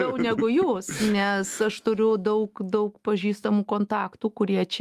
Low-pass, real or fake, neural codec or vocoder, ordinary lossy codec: 14.4 kHz; fake; autoencoder, 48 kHz, 128 numbers a frame, DAC-VAE, trained on Japanese speech; Opus, 32 kbps